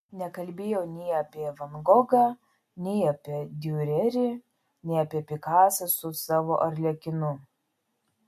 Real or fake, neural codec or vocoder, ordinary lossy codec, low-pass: real; none; MP3, 64 kbps; 14.4 kHz